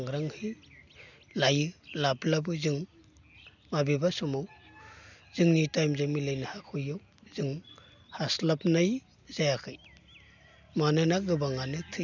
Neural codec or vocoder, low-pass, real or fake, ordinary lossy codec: none; 7.2 kHz; real; none